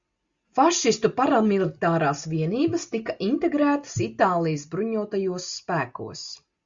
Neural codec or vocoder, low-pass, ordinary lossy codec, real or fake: none; 7.2 kHz; Opus, 64 kbps; real